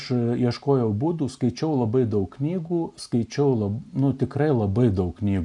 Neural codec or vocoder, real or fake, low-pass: none; real; 10.8 kHz